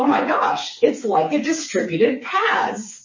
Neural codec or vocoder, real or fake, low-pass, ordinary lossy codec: codec, 16 kHz, 4 kbps, FreqCodec, smaller model; fake; 7.2 kHz; MP3, 32 kbps